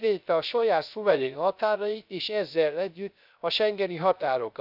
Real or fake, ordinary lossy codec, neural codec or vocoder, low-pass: fake; none; codec, 16 kHz, 0.3 kbps, FocalCodec; 5.4 kHz